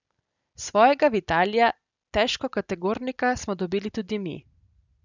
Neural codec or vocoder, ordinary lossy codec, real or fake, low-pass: none; none; real; 7.2 kHz